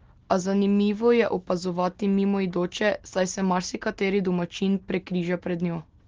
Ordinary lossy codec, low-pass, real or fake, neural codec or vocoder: Opus, 16 kbps; 7.2 kHz; real; none